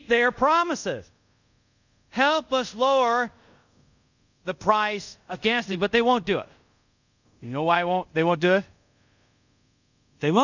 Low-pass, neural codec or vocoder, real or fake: 7.2 kHz; codec, 24 kHz, 0.5 kbps, DualCodec; fake